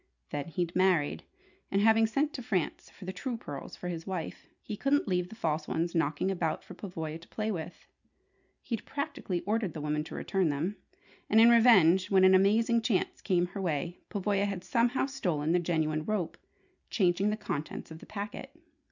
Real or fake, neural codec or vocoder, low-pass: real; none; 7.2 kHz